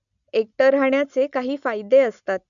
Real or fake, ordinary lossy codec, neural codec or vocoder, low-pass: real; none; none; 7.2 kHz